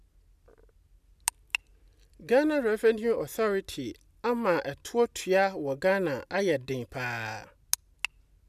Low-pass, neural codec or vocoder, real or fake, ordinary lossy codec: 14.4 kHz; none; real; none